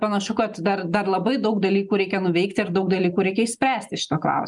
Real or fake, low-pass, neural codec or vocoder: real; 10.8 kHz; none